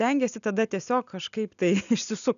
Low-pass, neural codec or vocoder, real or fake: 7.2 kHz; none; real